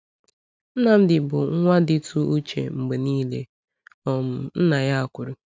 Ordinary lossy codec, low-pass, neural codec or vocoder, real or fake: none; none; none; real